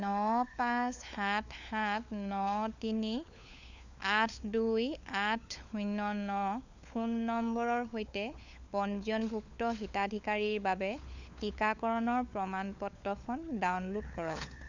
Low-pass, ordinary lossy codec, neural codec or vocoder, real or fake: 7.2 kHz; none; codec, 16 kHz, 4 kbps, FunCodec, trained on LibriTTS, 50 frames a second; fake